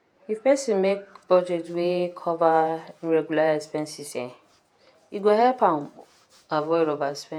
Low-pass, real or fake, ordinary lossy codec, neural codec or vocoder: 19.8 kHz; fake; none; vocoder, 48 kHz, 128 mel bands, Vocos